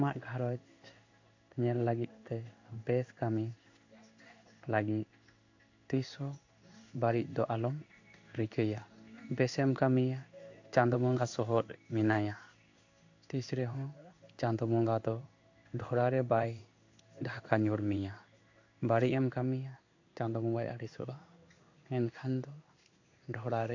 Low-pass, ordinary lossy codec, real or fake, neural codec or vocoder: 7.2 kHz; AAC, 48 kbps; fake; codec, 16 kHz in and 24 kHz out, 1 kbps, XY-Tokenizer